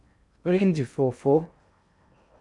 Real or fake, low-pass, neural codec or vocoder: fake; 10.8 kHz; codec, 16 kHz in and 24 kHz out, 0.8 kbps, FocalCodec, streaming, 65536 codes